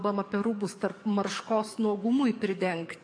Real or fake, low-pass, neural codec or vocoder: fake; 9.9 kHz; codec, 44.1 kHz, 7.8 kbps, DAC